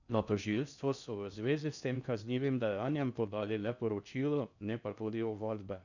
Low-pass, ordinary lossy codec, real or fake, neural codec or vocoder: 7.2 kHz; none; fake; codec, 16 kHz in and 24 kHz out, 0.6 kbps, FocalCodec, streaming, 4096 codes